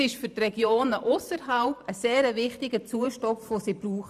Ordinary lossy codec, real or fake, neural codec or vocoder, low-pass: none; fake; vocoder, 44.1 kHz, 128 mel bands every 512 samples, BigVGAN v2; 14.4 kHz